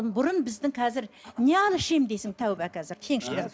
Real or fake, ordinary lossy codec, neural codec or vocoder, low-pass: real; none; none; none